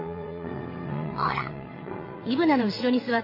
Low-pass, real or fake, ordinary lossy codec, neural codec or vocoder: 5.4 kHz; fake; MP3, 24 kbps; vocoder, 22.05 kHz, 80 mel bands, WaveNeXt